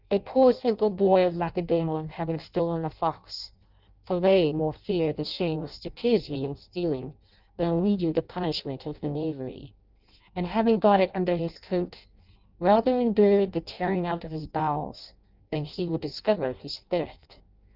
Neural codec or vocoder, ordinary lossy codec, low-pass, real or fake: codec, 16 kHz in and 24 kHz out, 0.6 kbps, FireRedTTS-2 codec; Opus, 24 kbps; 5.4 kHz; fake